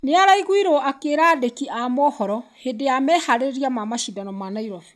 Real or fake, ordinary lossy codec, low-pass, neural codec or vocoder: real; none; none; none